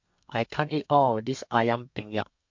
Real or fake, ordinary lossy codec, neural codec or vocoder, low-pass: fake; MP3, 48 kbps; codec, 32 kHz, 1.9 kbps, SNAC; 7.2 kHz